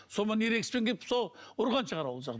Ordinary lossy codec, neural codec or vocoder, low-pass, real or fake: none; none; none; real